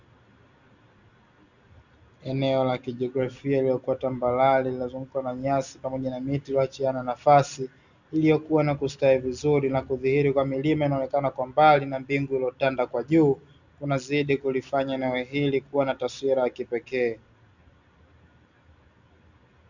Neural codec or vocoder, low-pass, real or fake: none; 7.2 kHz; real